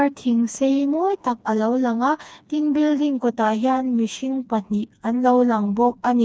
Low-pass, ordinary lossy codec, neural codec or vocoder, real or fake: none; none; codec, 16 kHz, 2 kbps, FreqCodec, smaller model; fake